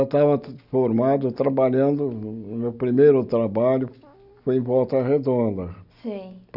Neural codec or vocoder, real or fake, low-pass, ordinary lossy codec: none; real; 5.4 kHz; none